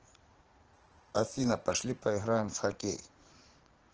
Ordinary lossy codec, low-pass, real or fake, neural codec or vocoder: Opus, 16 kbps; 7.2 kHz; fake; vocoder, 44.1 kHz, 80 mel bands, Vocos